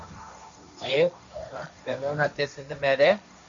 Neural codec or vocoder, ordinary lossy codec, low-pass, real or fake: codec, 16 kHz, 1.1 kbps, Voila-Tokenizer; MP3, 64 kbps; 7.2 kHz; fake